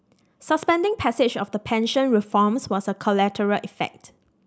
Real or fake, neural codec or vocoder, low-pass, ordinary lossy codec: real; none; none; none